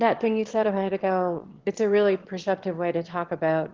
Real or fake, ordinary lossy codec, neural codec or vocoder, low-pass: fake; Opus, 16 kbps; autoencoder, 22.05 kHz, a latent of 192 numbers a frame, VITS, trained on one speaker; 7.2 kHz